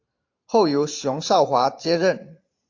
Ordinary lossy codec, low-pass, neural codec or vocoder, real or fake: AAC, 48 kbps; 7.2 kHz; vocoder, 44.1 kHz, 128 mel bands every 512 samples, BigVGAN v2; fake